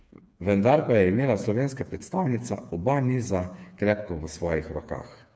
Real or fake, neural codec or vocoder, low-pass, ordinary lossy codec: fake; codec, 16 kHz, 4 kbps, FreqCodec, smaller model; none; none